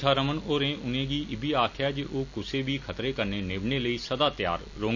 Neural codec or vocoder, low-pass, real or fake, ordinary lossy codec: none; 7.2 kHz; real; none